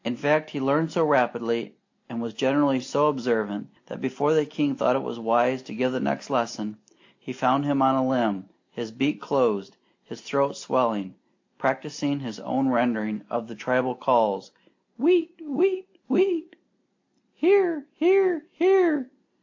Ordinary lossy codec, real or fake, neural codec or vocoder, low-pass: AAC, 48 kbps; real; none; 7.2 kHz